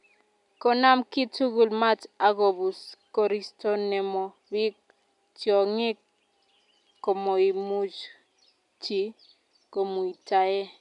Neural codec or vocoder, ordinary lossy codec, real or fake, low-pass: none; none; real; 10.8 kHz